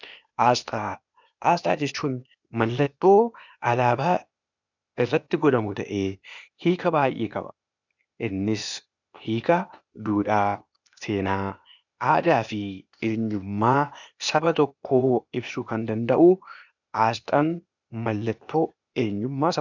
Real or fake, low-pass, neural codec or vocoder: fake; 7.2 kHz; codec, 16 kHz, 0.8 kbps, ZipCodec